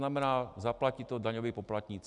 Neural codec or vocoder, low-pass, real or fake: none; 10.8 kHz; real